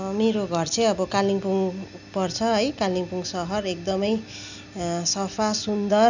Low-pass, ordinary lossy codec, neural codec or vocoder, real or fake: 7.2 kHz; none; none; real